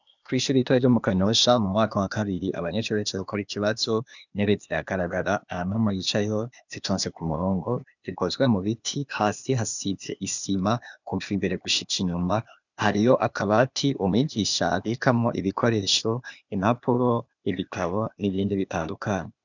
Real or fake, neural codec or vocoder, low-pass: fake; codec, 16 kHz, 0.8 kbps, ZipCodec; 7.2 kHz